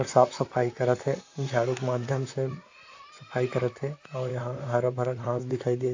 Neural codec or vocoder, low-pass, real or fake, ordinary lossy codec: vocoder, 44.1 kHz, 128 mel bands, Pupu-Vocoder; 7.2 kHz; fake; AAC, 48 kbps